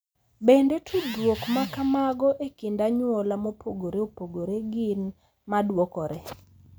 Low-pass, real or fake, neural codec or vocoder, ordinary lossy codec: none; real; none; none